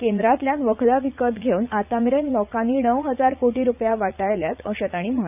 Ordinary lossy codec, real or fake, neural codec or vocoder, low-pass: none; fake; vocoder, 44.1 kHz, 80 mel bands, Vocos; 3.6 kHz